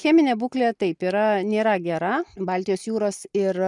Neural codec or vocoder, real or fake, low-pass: none; real; 10.8 kHz